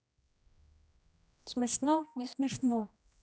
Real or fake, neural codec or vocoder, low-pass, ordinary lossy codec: fake; codec, 16 kHz, 1 kbps, X-Codec, HuBERT features, trained on general audio; none; none